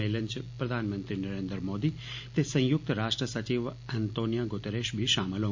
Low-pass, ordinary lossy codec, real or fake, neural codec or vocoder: 7.2 kHz; MP3, 48 kbps; real; none